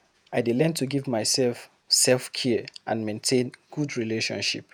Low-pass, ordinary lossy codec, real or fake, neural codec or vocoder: none; none; fake; vocoder, 48 kHz, 128 mel bands, Vocos